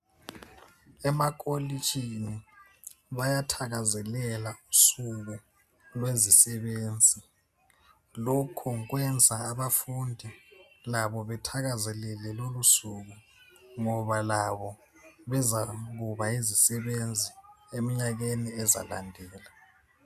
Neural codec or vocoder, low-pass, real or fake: none; 14.4 kHz; real